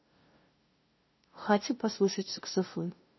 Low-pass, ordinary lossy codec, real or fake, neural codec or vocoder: 7.2 kHz; MP3, 24 kbps; fake; codec, 16 kHz, 0.5 kbps, FunCodec, trained on LibriTTS, 25 frames a second